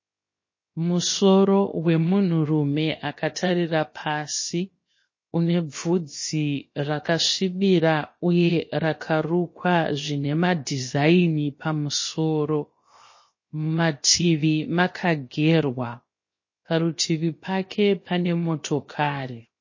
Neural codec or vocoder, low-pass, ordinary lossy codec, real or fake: codec, 16 kHz, 0.7 kbps, FocalCodec; 7.2 kHz; MP3, 32 kbps; fake